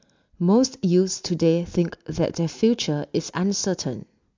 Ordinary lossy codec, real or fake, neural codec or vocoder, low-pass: MP3, 64 kbps; real; none; 7.2 kHz